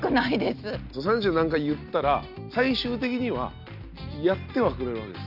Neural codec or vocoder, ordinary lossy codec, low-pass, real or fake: none; none; 5.4 kHz; real